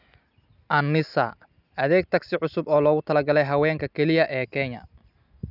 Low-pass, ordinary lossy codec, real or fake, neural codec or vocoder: 5.4 kHz; none; real; none